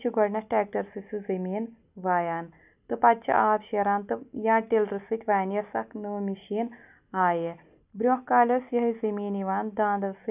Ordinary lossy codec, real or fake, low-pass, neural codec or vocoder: none; real; 3.6 kHz; none